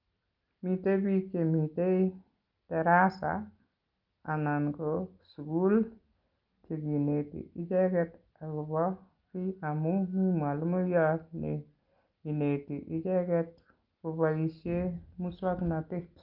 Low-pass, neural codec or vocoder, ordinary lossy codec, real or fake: 5.4 kHz; none; Opus, 24 kbps; real